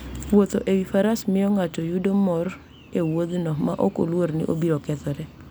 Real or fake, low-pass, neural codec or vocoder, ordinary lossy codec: real; none; none; none